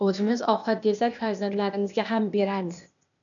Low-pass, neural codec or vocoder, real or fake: 7.2 kHz; codec, 16 kHz, 0.8 kbps, ZipCodec; fake